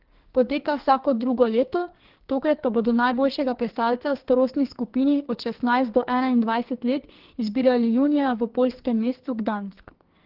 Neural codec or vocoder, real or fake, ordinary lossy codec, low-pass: codec, 44.1 kHz, 2.6 kbps, SNAC; fake; Opus, 16 kbps; 5.4 kHz